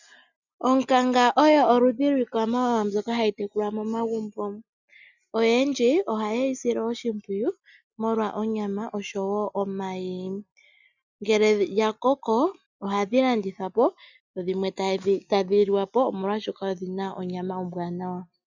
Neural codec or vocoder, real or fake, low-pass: none; real; 7.2 kHz